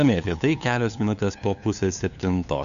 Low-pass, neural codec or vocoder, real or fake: 7.2 kHz; codec, 16 kHz, 2 kbps, FunCodec, trained on LibriTTS, 25 frames a second; fake